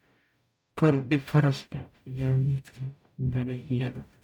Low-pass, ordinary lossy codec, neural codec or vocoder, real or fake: 19.8 kHz; none; codec, 44.1 kHz, 0.9 kbps, DAC; fake